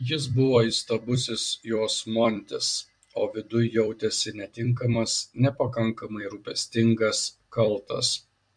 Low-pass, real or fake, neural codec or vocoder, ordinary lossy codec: 9.9 kHz; fake; vocoder, 44.1 kHz, 128 mel bands every 256 samples, BigVGAN v2; MP3, 64 kbps